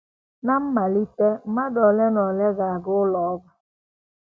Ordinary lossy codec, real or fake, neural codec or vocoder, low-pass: none; real; none; none